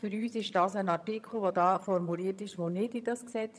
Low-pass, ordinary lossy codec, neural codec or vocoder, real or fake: none; none; vocoder, 22.05 kHz, 80 mel bands, HiFi-GAN; fake